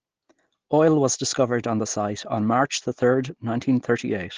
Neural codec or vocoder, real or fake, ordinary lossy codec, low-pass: none; real; Opus, 16 kbps; 7.2 kHz